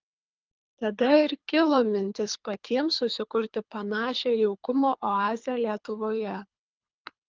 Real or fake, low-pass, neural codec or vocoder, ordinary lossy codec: fake; 7.2 kHz; codec, 24 kHz, 3 kbps, HILCodec; Opus, 32 kbps